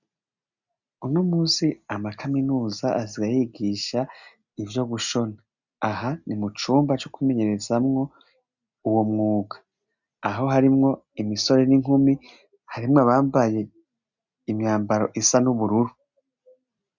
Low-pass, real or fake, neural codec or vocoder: 7.2 kHz; real; none